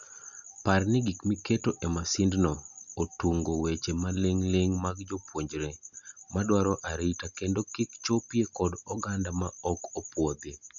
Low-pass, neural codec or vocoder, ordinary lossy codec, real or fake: 7.2 kHz; none; none; real